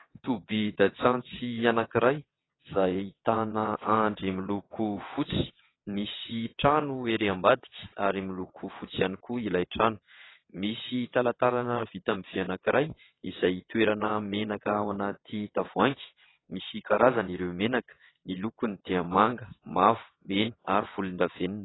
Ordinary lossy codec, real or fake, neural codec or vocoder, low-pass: AAC, 16 kbps; fake; vocoder, 22.05 kHz, 80 mel bands, WaveNeXt; 7.2 kHz